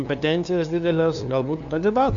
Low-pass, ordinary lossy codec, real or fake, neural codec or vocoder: 7.2 kHz; MP3, 96 kbps; fake; codec, 16 kHz, 2 kbps, FunCodec, trained on LibriTTS, 25 frames a second